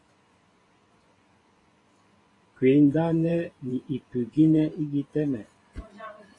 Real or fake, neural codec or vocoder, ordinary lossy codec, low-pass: fake; vocoder, 24 kHz, 100 mel bands, Vocos; AAC, 32 kbps; 10.8 kHz